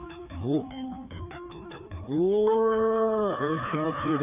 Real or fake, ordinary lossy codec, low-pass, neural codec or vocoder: fake; none; 3.6 kHz; codec, 16 kHz, 2 kbps, FreqCodec, larger model